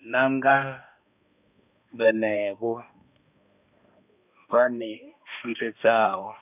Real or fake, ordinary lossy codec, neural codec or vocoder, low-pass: fake; none; codec, 16 kHz, 1 kbps, X-Codec, HuBERT features, trained on balanced general audio; 3.6 kHz